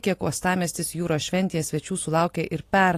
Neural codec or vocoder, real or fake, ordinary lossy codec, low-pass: none; real; AAC, 48 kbps; 14.4 kHz